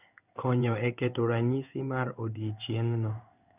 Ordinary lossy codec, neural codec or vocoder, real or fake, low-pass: none; codec, 16 kHz in and 24 kHz out, 1 kbps, XY-Tokenizer; fake; 3.6 kHz